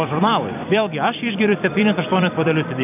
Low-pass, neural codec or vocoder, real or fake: 3.6 kHz; vocoder, 44.1 kHz, 128 mel bands every 256 samples, BigVGAN v2; fake